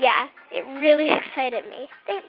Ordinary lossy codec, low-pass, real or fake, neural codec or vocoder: Opus, 32 kbps; 5.4 kHz; fake; vocoder, 22.05 kHz, 80 mel bands, WaveNeXt